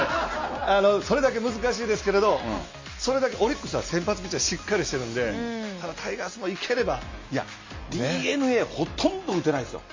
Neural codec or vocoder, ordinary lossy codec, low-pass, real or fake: none; MP3, 32 kbps; 7.2 kHz; real